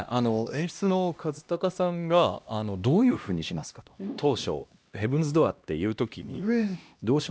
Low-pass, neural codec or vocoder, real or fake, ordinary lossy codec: none; codec, 16 kHz, 1 kbps, X-Codec, HuBERT features, trained on LibriSpeech; fake; none